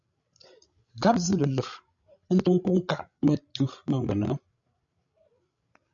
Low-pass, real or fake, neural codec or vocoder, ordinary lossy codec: 7.2 kHz; fake; codec, 16 kHz, 16 kbps, FreqCodec, larger model; MP3, 96 kbps